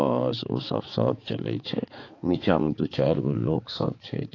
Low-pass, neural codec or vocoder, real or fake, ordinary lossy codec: 7.2 kHz; codec, 16 kHz, 4 kbps, X-Codec, HuBERT features, trained on balanced general audio; fake; AAC, 32 kbps